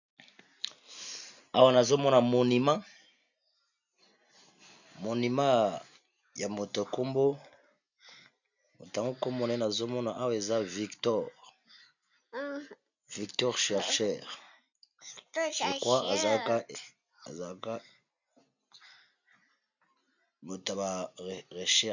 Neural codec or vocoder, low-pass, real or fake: none; 7.2 kHz; real